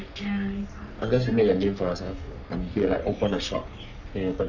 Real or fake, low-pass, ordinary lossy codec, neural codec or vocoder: fake; 7.2 kHz; none; codec, 44.1 kHz, 3.4 kbps, Pupu-Codec